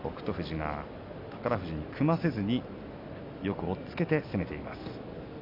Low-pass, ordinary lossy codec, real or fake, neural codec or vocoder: 5.4 kHz; MP3, 48 kbps; real; none